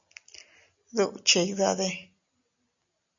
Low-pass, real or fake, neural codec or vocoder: 7.2 kHz; real; none